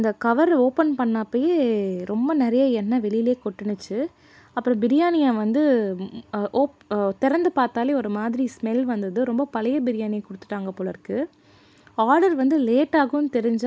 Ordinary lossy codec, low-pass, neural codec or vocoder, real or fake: none; none; none; real